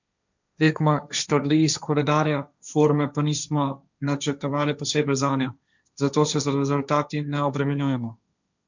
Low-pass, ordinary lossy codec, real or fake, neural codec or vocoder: 7.2 kHz; none; fake; codec, 16 kHz, 1.1 kbps, Voila-Tokenizer